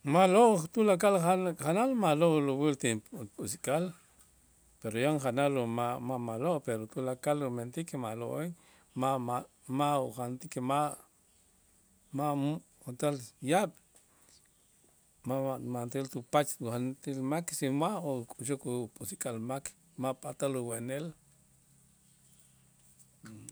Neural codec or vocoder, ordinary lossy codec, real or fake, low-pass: none; none; real; none